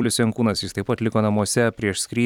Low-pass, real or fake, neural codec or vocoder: 19.8 kHz; fake; vocoder, 44.1 kHz, 128 mel bands every 256 samples, BigVGAN v2